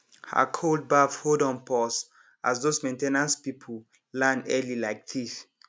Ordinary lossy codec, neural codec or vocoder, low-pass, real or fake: none; none; none; real